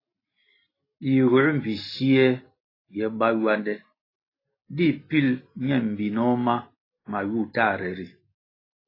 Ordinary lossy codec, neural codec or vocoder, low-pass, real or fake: AAC, 24 kbps; none; 5.4 kHz; real